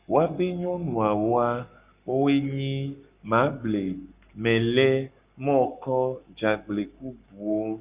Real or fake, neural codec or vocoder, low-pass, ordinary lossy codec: fake; codec, 44.1 kHz, 7.8 kbps, Pupu-Codec; 3.6 kHz; Opus, 64 kbps